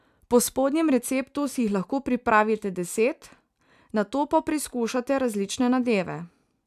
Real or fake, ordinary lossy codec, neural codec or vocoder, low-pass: real; none; none; 14.4 kHz